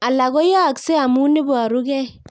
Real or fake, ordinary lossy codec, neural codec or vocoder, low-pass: real; none; none; none